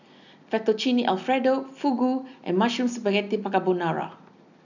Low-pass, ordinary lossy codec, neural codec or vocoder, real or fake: 7.2 kHz; none; none; real